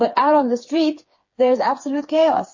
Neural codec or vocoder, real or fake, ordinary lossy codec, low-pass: codec, 16 kHz, 4 kbps, FreqCodec, smaller model; fake; MP3, 32 kbps; 7.2 kHz